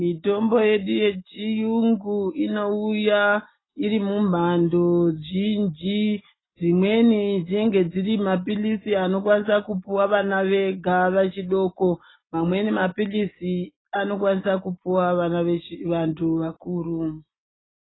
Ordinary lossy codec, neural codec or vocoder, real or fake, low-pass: AAC, 16 kbps; none; real; 7.2 kHz